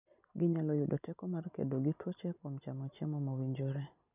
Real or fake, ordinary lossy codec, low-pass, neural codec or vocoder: real; none; 3.6 kHz; none